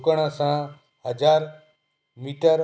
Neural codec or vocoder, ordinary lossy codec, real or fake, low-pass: none; none; real; none